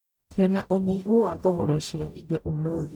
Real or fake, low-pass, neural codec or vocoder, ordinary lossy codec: fake; 19.8 kHz; codec, 44.1 kHz, 0.9 kbps, DAC; none